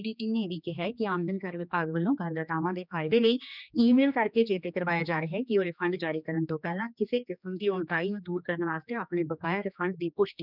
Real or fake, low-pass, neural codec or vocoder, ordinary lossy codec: fake; 5.4 kHz; codec, 16 kHz, 2 kbps, X-Codec, HuBERT features, trained on general audio; none